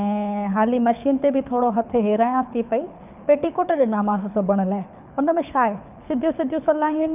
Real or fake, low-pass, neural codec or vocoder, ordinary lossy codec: fake; 3.6 kHz; codec, 24 kHz, 6 kbps, HILCodec; none